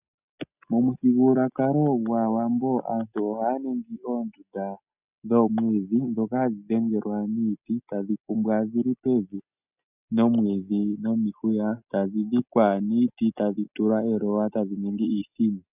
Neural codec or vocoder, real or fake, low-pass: none; real; 3.6 kHz